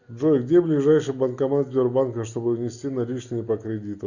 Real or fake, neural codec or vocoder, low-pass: real; none; 7.2 kHz